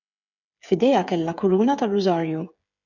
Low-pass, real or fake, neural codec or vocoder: 7.2 kHz; fake; codec, 16 kHz, 8 kbps, FreqCodec, smaller model